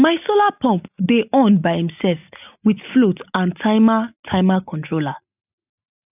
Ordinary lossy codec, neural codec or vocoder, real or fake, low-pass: none; none; real; 3.6 kHz